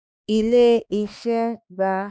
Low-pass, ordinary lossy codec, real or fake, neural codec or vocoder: none; none; fake; codec, 16 kHz, 2 kbps, X-Codec, HuBERT features, trained on balanced general audio